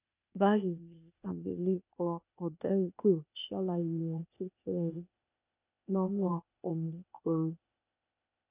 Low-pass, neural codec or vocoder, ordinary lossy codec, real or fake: 3.6 kHz; codec, 16 kHz, 0.8 kbps, ZipCodec; none; fake